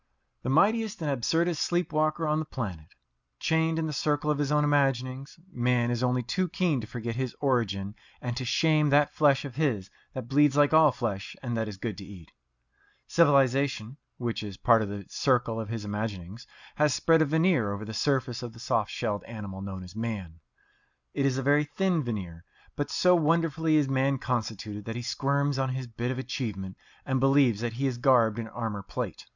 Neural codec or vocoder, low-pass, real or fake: none; 7.2 kHz; real